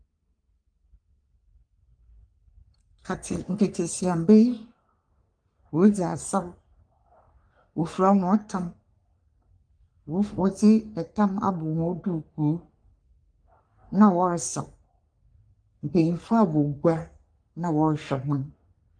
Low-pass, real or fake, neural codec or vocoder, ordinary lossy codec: 9.9 kHz; fake; codec, 44.1 kHz, 3.4 kbps, Pupu-Codec; Opus, 32 kbps